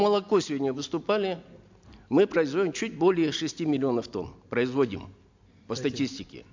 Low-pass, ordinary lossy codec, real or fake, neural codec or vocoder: 7.2 kHz; MP3, 64 kbps; real; none